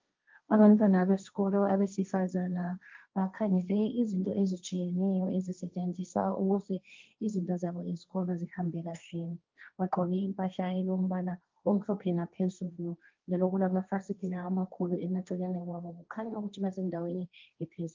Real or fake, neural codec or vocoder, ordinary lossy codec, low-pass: fake; codec, 16 kHz, 1.1 kbps, Voila-Tokenizer; Opus, 32 kbps; 7.2 kHz